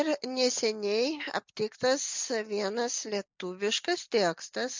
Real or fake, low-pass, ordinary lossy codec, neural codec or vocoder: real; 7.2 kHz; MP3, 64 kbps; none